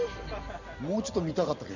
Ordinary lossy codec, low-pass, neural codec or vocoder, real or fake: none; 7.2 kHz; none; real